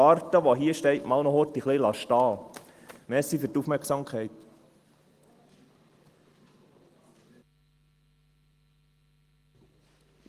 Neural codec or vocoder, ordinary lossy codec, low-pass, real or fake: none; Opus, 24 kbps; 14.4 kHz; real